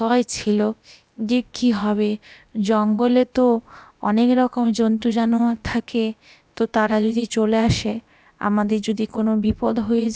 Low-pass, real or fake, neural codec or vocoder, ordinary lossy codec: none; fake; codec, 16 kHz, about 1 kbps, DyCAST, with the encoder's durations; none